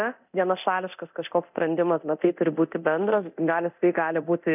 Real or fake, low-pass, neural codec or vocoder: fake; 3.6 kHz; codec, 24 kHz, 0.9 kbps, DualCodec